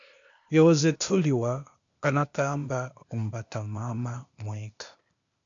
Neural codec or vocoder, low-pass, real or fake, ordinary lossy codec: codec, 16 kHz, 0.8 kbps, ZipCodec; 7.2 kHz; fake; MP3, 96 kbps